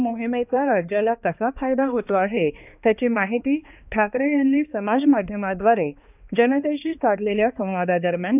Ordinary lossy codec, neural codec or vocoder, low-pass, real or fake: none; codec, 16 kHz, 2 kbps, X-Codec, HuBERT features, trained on balanced general audio; 3.6 kHz; fake